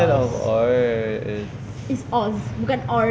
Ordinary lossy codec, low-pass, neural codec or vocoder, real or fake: none; none; none; real